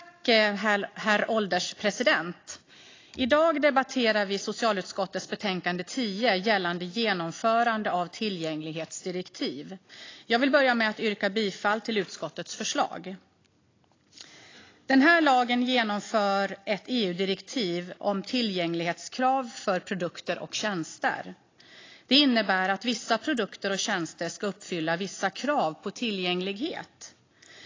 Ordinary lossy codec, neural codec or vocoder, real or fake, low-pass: AAC, 32 kbps; none; real; 7.2 kHz